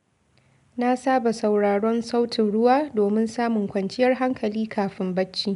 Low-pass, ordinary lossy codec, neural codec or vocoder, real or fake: 10.8 kHz; none; none; real